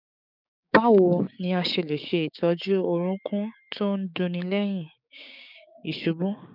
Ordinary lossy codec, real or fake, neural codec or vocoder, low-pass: none; fake; codec, 16 kHz, 6 kbps, DAC; 5.4 kHz